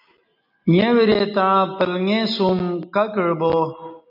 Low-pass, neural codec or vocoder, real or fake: 5.4 kHz; none; real